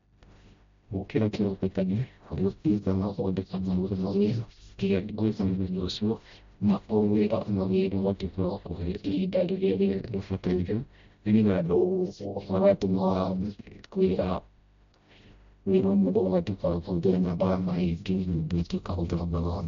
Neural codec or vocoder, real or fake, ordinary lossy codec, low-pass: codec, 16 kHz, 0.5 kbps, FreqCodec, smaller model; fake; MP3, 48 kbps; 7.2 kHz